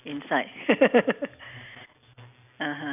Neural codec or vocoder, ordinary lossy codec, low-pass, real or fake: none; none; 3.6 kHz; real